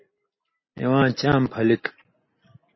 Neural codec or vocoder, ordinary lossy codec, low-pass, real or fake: none; MP3, 24 kbps; 7.2 kHz; real